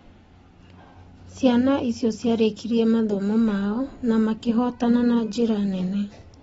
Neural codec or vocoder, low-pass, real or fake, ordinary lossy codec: none; 19.8 kHz; real; AAC, 24 kbps